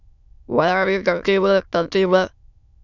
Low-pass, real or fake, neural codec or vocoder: 7.2 kHz; fake; autoencoder, 22.05 kHz, a latent of 192 numbers a frame, VITS, trained on many speakers